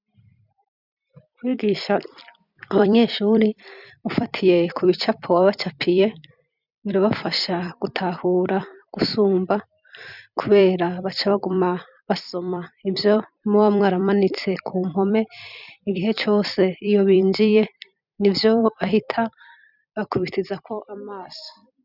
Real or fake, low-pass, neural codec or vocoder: fake; 5.4 kHz; vocoder, 44.1 kHz, 128 mel bands every 256 samples, BigVGAN v2